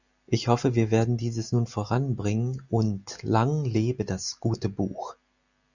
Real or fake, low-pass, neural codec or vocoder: real; 7.2 kHz; none